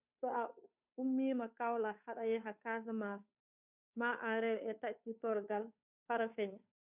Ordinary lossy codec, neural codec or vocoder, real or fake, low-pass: none; codec, 16 kHz, 2 kbps, FunCodec, trained on Chinese and English, 25 frames a second; fake; 3.6 kHz